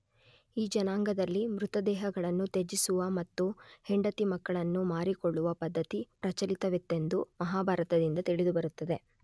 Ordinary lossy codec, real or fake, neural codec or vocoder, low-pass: none; real; none; none